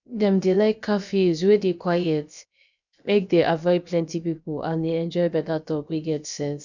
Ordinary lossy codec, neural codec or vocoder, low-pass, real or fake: none; codec, 16 kHz, about 1 kbps, DyCAST, with the encoder's durations; 7.2 kHz; fake